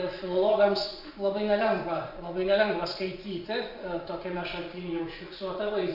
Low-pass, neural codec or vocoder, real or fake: 5.4 kHz; vocoder, 24 kHz, 100 mel bands, Vocos; fake